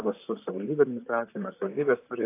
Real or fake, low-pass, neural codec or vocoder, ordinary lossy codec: fake; 3.6 kHz; vocoder, 44.1 kHz, 128 mel bands, Pupu-Vocoder; AAC, 24 kbps